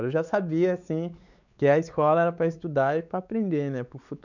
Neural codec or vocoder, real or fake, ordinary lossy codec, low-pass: codec, 16 kHz, 4 kbps, X-Codec, WavLM features, trained on Multilingual LibriSpeech; fake; none; 7.2 kHz